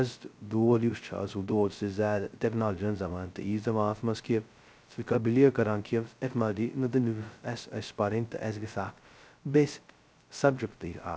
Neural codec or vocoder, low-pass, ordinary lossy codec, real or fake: codec, 16 kHz, 0.2 kbps, FocalCodec; none; none; fake